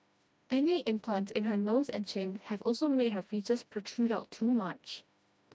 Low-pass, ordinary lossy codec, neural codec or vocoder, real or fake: none; none; codec, 16 kHz, 1 kbps, FreqCodec, smaller model; fake